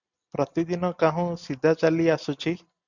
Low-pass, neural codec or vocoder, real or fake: 7.2 kHz; none; real